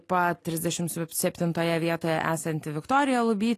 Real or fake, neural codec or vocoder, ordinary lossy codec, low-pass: real; none; AAC, 48 kbps; 14.4 kHz